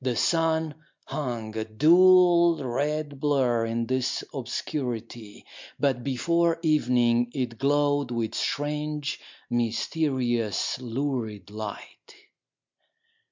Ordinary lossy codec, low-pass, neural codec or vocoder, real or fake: MP3, 64 kbps; 7.2 kHz; none; real